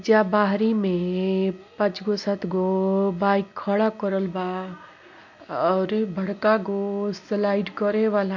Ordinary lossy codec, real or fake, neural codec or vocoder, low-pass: MP3, 48 kbps; real; none; 7.2 kHz